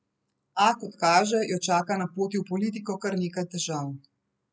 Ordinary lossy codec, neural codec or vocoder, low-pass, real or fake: none; none; none; real